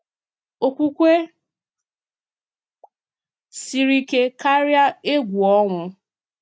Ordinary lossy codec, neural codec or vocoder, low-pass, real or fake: none; none; none; real